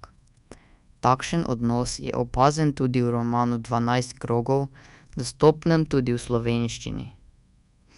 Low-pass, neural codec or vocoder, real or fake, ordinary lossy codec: 10.8 kHz; codec, 24 kHz, 1.2 kbps, DualCodec; fake; none